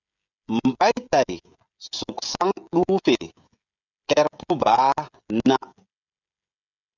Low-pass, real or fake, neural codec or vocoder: 7.2 kHz; fake; codec, 16 kHz, 16 kbps, FreqCodec, smaller model